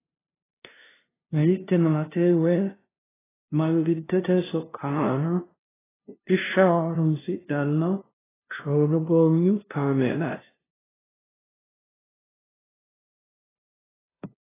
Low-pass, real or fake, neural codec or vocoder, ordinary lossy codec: 3.6 kHz; fake; codec, 16 kHz, 0.5 kbps, FunCodec, trained on LibriTTS, 25 frames a second; AAC, 16 kbps